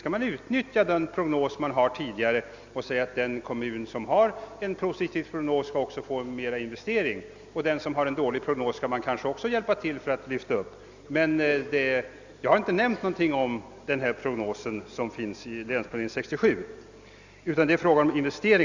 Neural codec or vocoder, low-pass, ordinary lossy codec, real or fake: none; 7.2 kHz; Opus, 64 kbps; real